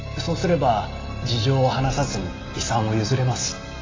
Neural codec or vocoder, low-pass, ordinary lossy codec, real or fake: none; 7.2 kHz; AAC, 32 kbps; real